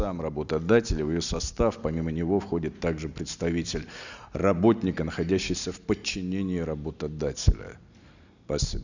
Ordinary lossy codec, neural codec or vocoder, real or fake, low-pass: none; none; real; 7.2 kHz